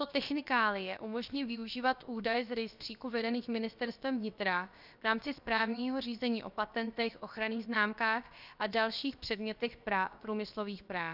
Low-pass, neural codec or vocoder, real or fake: 5.4 kHz; codec, 16 kHz, about 1 kbps, DyCAST, with the encoder's durations; fake